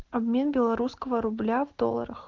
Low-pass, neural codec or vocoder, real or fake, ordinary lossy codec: 7.2 kHz; none; real; Opus, 16 kbps